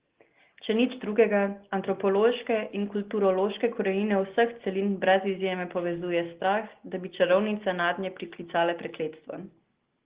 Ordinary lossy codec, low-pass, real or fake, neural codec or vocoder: Opus, 16 kbps; 3.6 kHz; real; none